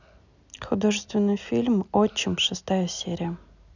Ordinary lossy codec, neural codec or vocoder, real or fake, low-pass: none; none; real; 7.2 kHz